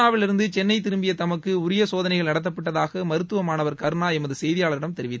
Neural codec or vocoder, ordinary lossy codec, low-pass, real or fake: none; none; none; real